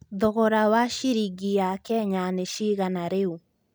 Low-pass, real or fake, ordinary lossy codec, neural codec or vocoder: none; real; none; none